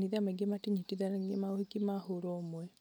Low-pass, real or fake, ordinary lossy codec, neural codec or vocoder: none; real; none; none